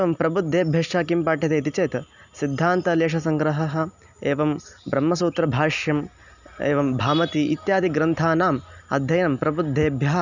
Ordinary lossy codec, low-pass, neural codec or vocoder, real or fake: none; 7.2 kHz; none; real